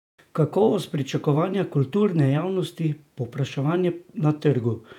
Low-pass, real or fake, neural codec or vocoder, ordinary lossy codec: 19.8 kHz; fake; vocoder, 48 kHz, 128 mel bands, Vocos; none